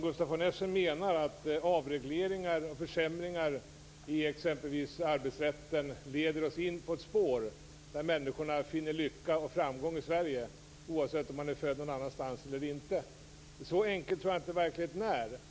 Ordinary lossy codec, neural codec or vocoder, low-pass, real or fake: none; none; none; real